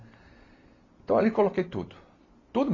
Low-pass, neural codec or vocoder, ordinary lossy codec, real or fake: 7.2 kHz; none; AAC, 32 kbps; real